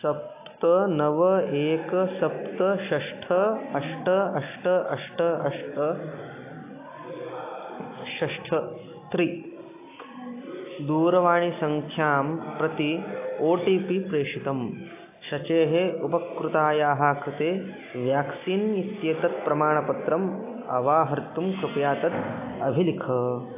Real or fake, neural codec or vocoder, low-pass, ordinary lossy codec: real; none; 3.6 kHz; AAC, 24 kbps